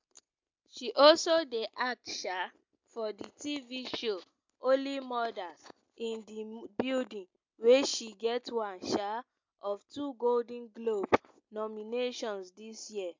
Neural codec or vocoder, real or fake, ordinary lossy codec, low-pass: none; real; MP3, 64 kbps; 7.2 kHz